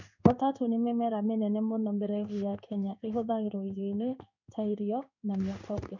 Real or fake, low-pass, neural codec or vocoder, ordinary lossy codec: fake; 7.2 kHz; codec, 16 kHz in and 24 kHz out, 1 kbps, XY-Tokenizer; none